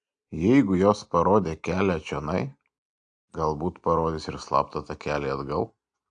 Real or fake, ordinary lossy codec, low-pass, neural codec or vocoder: real; AAC, 64 kbps; 9.9 kHz; none